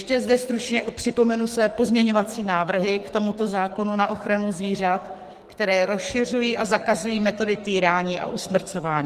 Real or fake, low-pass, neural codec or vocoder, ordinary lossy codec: fake; 14.4 kHz; codec, 44.1 kHz, 2.6 kbps, SNAC; Opus, 24 kbps